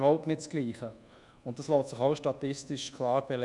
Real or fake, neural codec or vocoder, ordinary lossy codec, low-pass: fake; codec, 24 kHz, 1.2 kbps, DualCodec; none; 10.8 kHz